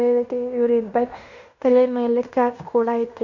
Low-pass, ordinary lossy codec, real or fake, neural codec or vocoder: 7.2 kHz; none; fake; codec, 16 kHz in and 24 kHz out, 0.9 kbps, LongCat-Audio-Codec, fine tuned four codebook decoder